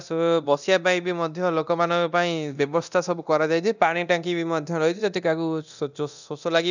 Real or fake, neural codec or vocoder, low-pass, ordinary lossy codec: fake; codec, 24 kHz, 0.9 kbps, DualCodec; 7.2 kHz; none